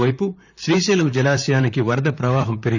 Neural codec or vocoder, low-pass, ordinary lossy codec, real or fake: vocoder, 44.1 kHz, 128 mel bands, Pupu-Vocoder; 7.2 kHz; none; fake